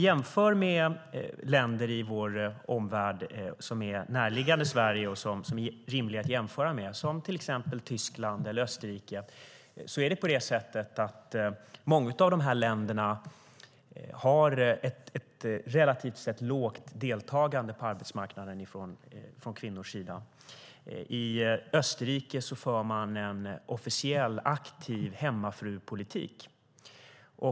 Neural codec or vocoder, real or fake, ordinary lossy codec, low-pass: none; real; none; none